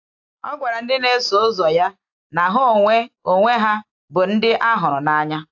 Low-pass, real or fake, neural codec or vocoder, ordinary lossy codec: 7.2 kHz; real; none; AAC, 48 kbps